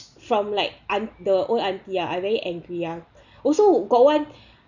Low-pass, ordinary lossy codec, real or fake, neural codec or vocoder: 7.2 kHz; none; real; none